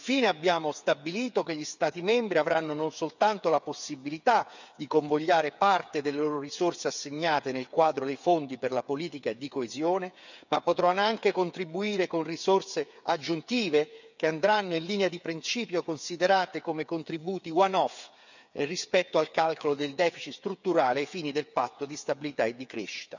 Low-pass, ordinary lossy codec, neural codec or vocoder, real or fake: 7.2 kHz; none; codec, 16 kHz, 8 kbps, FreqCodec, smaller model; fake